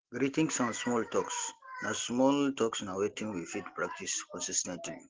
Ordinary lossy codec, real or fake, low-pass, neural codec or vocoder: Opus, 16 kbps; real; 7.2 kHz; none